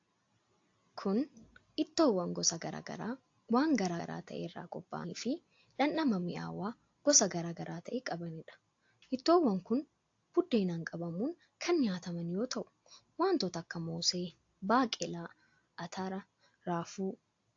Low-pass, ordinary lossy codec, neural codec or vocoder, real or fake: 7.2 kHz; AAC, 48 kbps; none; real